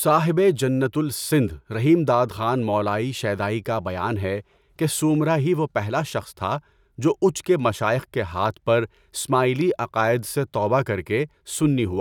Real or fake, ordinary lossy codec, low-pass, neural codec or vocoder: real; none; 19.8 kHz; none